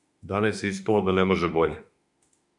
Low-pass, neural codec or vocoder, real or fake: 10.8 kHz; autoencoder, 48 kHz, 32 numbers a frame, DAC-VAE, trained on Japanese speech; fake